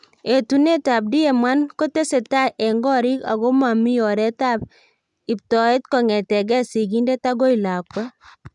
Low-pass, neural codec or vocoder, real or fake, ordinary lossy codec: 10.8 kHz; none; real; none